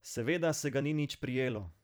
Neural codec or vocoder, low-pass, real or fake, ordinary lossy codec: vocoder, 44.1 kHz, 128 mel bands every 256 samples, BigVGAN v2; none; fake; none